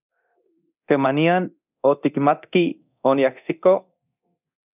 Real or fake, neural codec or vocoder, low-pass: fake; codec, 24 kHz, 0.9 kbps, DualCodec; 3.6 kHz